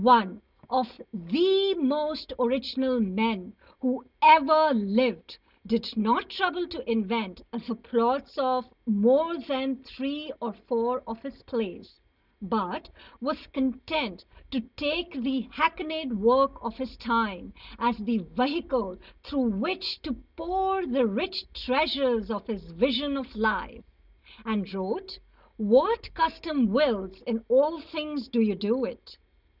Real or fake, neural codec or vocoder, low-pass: real; none; 5.4 kHz